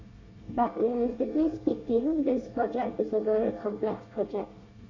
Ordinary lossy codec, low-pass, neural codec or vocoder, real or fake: AAC, 48 kbps; 7.2 kHz; codec, 24 kHz, 1 kbps, SNAC; fake